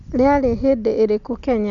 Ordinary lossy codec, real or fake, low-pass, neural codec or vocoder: none; real; 7.2 kHz; none